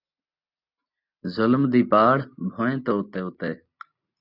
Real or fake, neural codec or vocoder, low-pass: real; none; 5.4 kHz